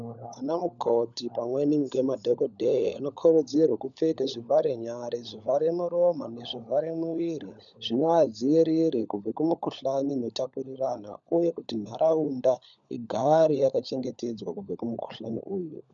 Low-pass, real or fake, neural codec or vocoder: 7.2 kHz; fake; codec, 16 kHz, 16 kbps, FunCodec, trained on LibriTTS, 50 frames a second